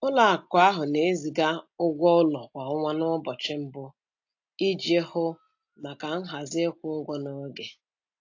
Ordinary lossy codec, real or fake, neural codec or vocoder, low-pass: AAC, 48 kbps; real; none; 7.2 kHz